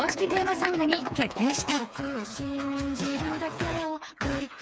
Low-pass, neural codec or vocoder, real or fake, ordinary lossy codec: none; codec, 16 kHz, 4 kbps, FreqCodec, smaller model; fake; none